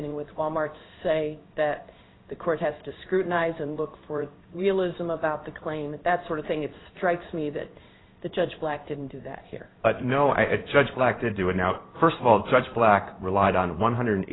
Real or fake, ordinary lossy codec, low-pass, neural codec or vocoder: fake; AAC, 16 kbps; 7.2 kHz; codec, 16 kHz in and 24 kHz out, 1 kbps, XY-Tokenizer